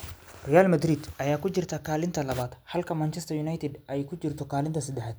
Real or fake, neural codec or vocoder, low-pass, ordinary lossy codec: real; none; none; none